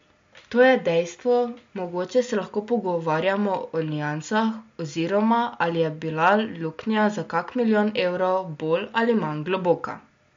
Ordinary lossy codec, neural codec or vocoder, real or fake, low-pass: MP3, 48 kbps; none; real; 7.2 kHz